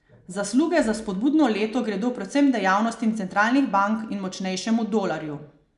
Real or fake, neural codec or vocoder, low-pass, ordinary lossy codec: real; none; 10.8 kHz; none